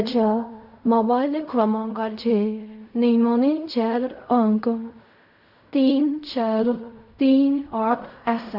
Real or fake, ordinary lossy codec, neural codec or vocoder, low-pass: fake; none; codec, 16 kHz in and 24 kHz out, 0.4 kbps, LongCat-Audio-Codec, fine tuned four codebook decoder; 5.4 kHz